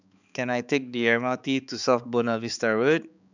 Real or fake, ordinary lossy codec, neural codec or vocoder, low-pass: fake; none; codec, 16 kHz, 4 kbps, X-Codec, HuBERT features, trained on balanced general audio; 7.2 kHz